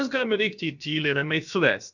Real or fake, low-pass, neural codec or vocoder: fake; 7.2 kHz; codec, 16 kHz, about 1 kbps, DyCAST, with the encoder's durations